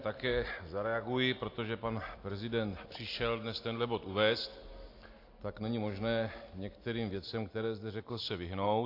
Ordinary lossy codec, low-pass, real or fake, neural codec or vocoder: AAC, 32 kbps; 5.4 kHz; real; none